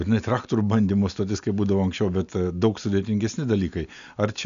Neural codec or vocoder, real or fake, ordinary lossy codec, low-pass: none; real; AAC, 96 kbps; 7.2 kHz